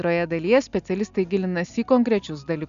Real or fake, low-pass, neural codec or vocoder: real; 7.2 kHz; none